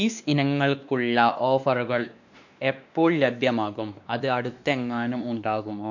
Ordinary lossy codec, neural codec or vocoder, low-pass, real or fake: none; codec, 16 kHz, 2 kbps, X-Codec, WavLM features, trained on Multilingual LibriSpeech; 7.2 kHz; fake